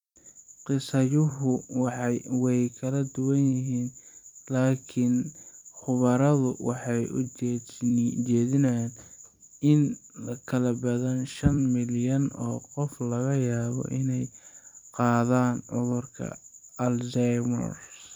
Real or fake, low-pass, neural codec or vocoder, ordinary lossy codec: fake; 19.8 kHz; vocoder, 44.1 kHz, 128 mel bands every 256 samples, BigVGAN v2; none